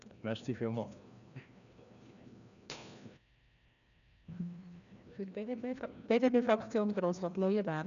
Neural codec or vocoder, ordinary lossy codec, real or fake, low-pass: codec, 16 kHz, 1 kbps, FreqCodec, larger model; none; fake; 7.2 kHz